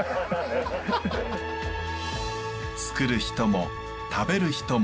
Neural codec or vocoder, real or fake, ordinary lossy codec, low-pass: none; real; none; none